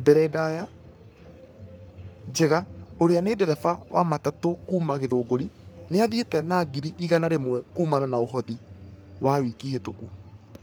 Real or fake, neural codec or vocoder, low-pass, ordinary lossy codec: fake; codec, 44.1 kHz, 3.4 kbps, Pupu-Codec; none; none